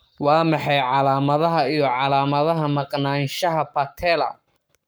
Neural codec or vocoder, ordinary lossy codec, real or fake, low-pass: codec, 44.1 kHz, 7.8 kbps, Pupu-Codec; none; fake; none